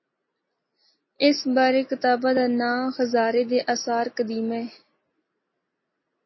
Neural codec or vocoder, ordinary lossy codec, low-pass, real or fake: none; MP3, 24 kbps; 7.2 kHz; real